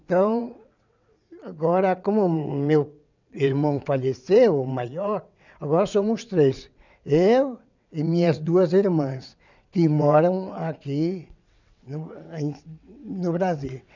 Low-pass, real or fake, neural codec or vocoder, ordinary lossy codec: 7.2 kHz; fake; vocoder, 22.05 kHz, 80 mel bands, WaveNeXt; none